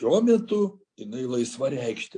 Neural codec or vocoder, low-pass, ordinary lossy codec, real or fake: none; 9.9 kHz; AAC, 48 kbps; real